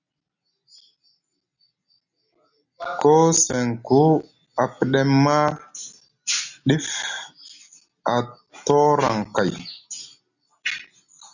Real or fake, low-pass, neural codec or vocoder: real; 7.2 kHz; none